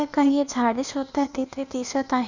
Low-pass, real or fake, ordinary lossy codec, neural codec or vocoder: 7.2 kHz; fake; none; codec, 16 kHz, 0.8 kbps, ZipCodec